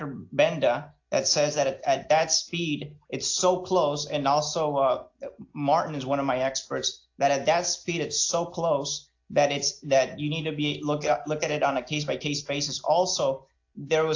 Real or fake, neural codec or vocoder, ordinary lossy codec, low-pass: real; none; AAC, 48 kbps; 7.2 kHz